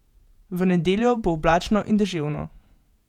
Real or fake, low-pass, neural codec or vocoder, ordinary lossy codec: fake; 19.8 kHz; vocoder, 48 kHz, 128 mel bands, Vocos; none